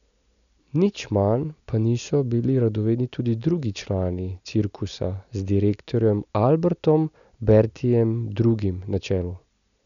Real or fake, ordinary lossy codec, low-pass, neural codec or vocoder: real; none; 7.2 kHz; none